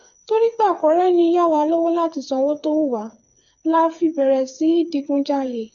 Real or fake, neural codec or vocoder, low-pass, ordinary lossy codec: fake; codec, 16 kHz, 4 kbps, FreqCodec, smaller model; 7.2 kHz; none